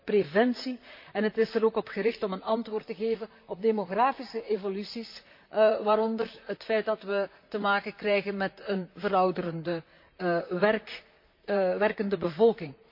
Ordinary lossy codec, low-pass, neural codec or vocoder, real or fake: MP3, 32 kbps; 5.4 kHz; vocoder, 44.1 kHz, 128 mel bands, Pupu-Vocoder; fake